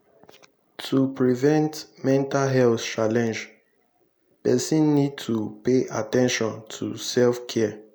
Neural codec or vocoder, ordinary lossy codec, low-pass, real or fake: none; none; none; real